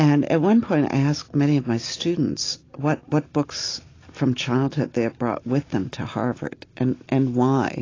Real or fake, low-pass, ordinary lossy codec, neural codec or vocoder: real; 7.2 kHz; AAC, 32 kbps; none